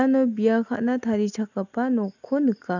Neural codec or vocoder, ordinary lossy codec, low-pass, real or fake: none; none; 7.2 kHz; real